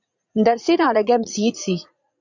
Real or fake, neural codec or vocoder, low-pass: fake; vocoder, 44.1 kHz, 80 mel bands, Vocos; 7.2 kHz